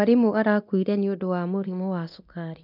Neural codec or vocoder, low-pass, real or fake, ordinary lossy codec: codec, 24 kHz, 0.9 kbps, DualCodec; 5.4 kHz; fake; none